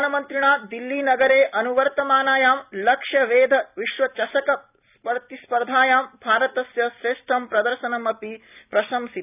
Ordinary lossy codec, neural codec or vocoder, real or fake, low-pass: none; none; real; 3.6 kHz